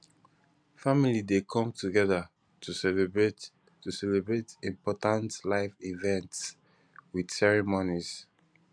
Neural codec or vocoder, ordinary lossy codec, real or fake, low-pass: none; none; real; 9.9 kHz